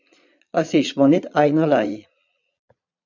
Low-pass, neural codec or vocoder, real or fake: 7.2 kHz; vocoder, 22.05 kHz, 80 mel bands, Vocos; fake